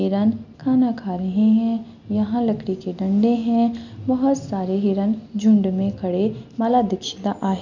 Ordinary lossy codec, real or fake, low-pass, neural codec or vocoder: none; real; 7.2 kHz; none